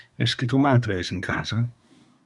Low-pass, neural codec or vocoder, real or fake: 10.8 kHz; codec, 24 kHz, 1 kbps, SNAC; fake